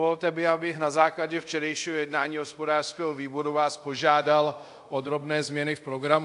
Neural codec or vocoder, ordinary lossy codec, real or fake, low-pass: codec, 24 kHz, 0.5 kbps, DualCodec; AAC, 64 kbps; fake; 10.8 kHz